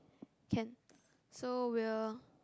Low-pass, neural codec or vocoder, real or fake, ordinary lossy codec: none; none; real; none